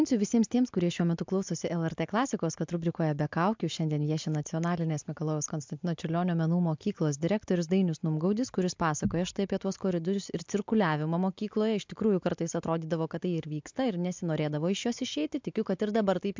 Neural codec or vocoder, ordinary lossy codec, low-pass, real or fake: none; MP3, 64 kbps; 7.2 kHz; real